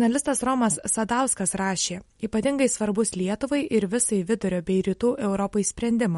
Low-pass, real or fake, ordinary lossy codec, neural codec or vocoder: 19.8 kHz; real; MP3, 48 kbps; none